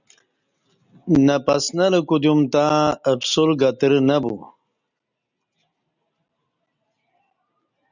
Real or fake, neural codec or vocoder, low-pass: real; none; 7.2 kHz